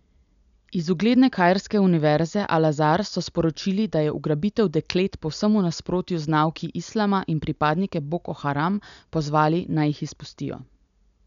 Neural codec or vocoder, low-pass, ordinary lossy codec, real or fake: none; 7.2 kHz; none; real